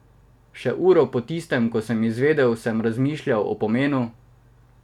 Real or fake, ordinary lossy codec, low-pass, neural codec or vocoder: real; none; 19.8 kHz; none